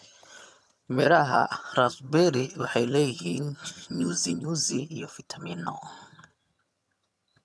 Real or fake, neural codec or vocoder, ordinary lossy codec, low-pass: fake; vocoder, 22.05 kHz, 80 mel bands, HiFi-GAN; none; none